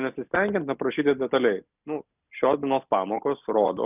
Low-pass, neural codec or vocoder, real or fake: 3.6 kHz; none; real